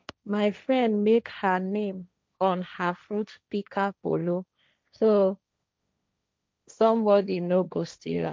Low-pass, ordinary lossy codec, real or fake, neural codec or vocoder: none; none; fake; codec, 16 kHz, 1.1 kbps, Voila-Tokenizer